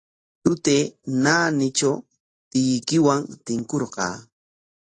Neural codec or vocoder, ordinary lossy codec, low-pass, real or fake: none; AAC, 32 kbps; 10.8 kHz; real